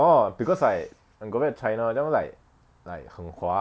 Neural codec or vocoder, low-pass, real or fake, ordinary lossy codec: none; none; real; none